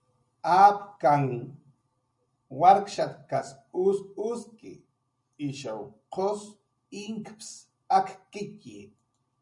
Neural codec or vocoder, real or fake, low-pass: none; real; 10.8 kHz